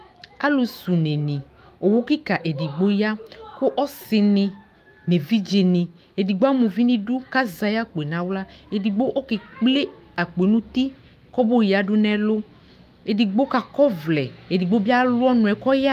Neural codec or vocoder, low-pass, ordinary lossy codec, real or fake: autoencoder, 48 kHz, 128 numbers a frame, DAC-VAE, trained on Japanese speech; 14.4 kHz; Opus, 32 kbps; fake